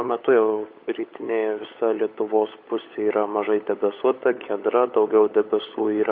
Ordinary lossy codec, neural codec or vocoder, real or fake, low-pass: MP3, 32 kbps; codec, 16 kHz, 16 kbps, FunCodec, trained on LibriTTS, 50 frames a second; fake; 5.4 kHz